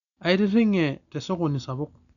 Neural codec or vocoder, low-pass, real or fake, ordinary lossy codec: none; 7.2 kHz; real; none